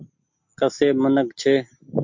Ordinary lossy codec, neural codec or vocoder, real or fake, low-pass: MP3, 48 kbps; none; real; 7.2 kHz